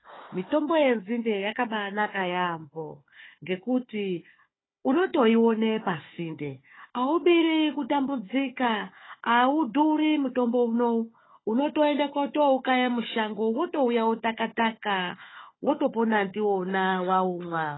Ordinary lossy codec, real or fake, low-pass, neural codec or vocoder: AAC, 16 kbps; fake; 7.2 kHz; codec, 16 kHz, 4 kbps, FunCodec, trained on Chinese and English, 50 frames a second